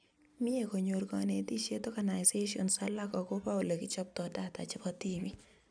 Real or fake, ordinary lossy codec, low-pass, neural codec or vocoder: real; none; 9.9 kHz; none